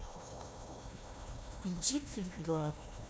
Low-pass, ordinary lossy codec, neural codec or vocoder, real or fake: none; none; codec, 16 kHz, 1 kbps, FunCodec, trained on Chinese and English, 50 frames a second; fake